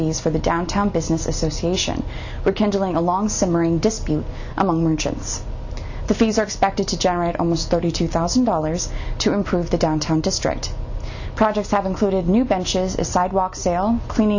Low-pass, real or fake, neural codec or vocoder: 7.2 kHz; real; none